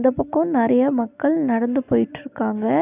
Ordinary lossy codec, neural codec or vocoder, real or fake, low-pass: AAC, 32 kbps; none; real; 3.6 kHz